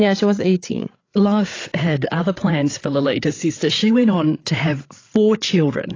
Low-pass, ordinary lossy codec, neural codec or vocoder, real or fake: 7.2 kHz; AAC, 32 kbps; codec, 16 kHz, 8 kbps, FreqCodec, larger model; fake